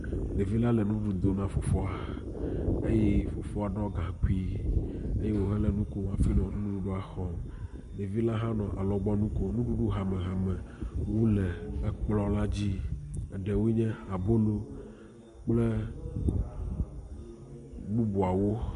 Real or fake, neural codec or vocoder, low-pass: real; none; 10.8 kHz